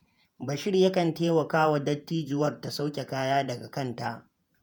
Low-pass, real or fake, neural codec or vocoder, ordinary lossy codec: none; fake; vocoder, 48 kHz, 128 mel bands, Vocos; none